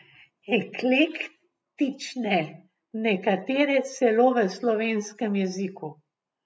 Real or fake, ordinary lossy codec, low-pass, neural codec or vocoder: real; none; none; none